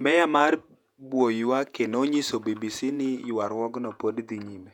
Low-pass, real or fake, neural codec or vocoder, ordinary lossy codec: 19.8 kHz; fake; vocoder, 48 kHz, 128 mel bands, Vocos; none